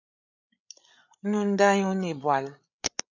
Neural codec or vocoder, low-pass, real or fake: codec, 16 kHz, 8 kbps, FreqCodec, larger model; 7.2 kHz; fake